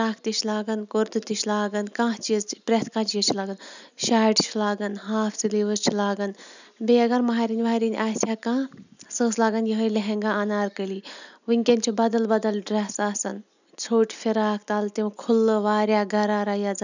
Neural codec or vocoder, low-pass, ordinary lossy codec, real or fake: none; 7.2 kHz; none; real